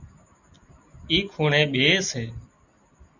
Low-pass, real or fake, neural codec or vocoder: 7.2 kHz; real; none